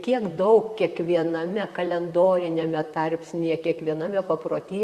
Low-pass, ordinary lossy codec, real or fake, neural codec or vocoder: 14.4 kHz; Opus, 64 kbps; fake; vocoder, 44.1 kHz, 128 mel bands, Pupu-Vocoder